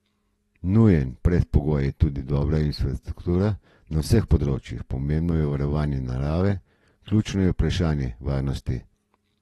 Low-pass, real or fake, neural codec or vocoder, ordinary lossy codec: 19.8 kHz; real; none; AAC, 32 kbps